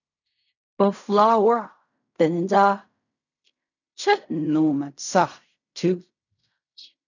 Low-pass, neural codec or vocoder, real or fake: 7.2 kHz; codec, 16 kHz in and 24 kHz out, 0.4 kbps, LongCat-Audio-Codec, fine tuned four codebook decoder; fake